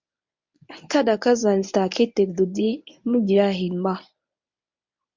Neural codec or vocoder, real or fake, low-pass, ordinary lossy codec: codec, 24 kHz, 0.9 kbps, WavTokenizer, medium speech release version 1; fake; 7.2 kHz; MP3, 64 kbps